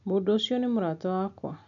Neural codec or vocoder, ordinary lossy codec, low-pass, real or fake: none; none; 7.2 kHz; real